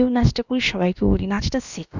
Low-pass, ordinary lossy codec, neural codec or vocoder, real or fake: 7.2 kHz; none; codec, 16 kHz, about 1 kbps, DyCAST, with the encoder's durations; fake